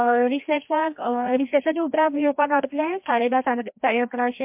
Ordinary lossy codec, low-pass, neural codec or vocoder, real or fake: MP3, 32 kbps; 3.6 kHz; codec, 16 kHz, 1 kbps, FreqCodec, larger model; fake